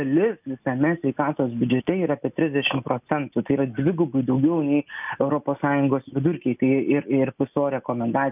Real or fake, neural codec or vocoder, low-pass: real; none; 3.6 kHz